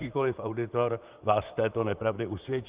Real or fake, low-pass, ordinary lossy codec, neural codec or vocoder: fake; 3.6 kHz; Opus, 32 kbps; vocoder, 44.1 kHz, 128 mel bands, Pupu-Vocoder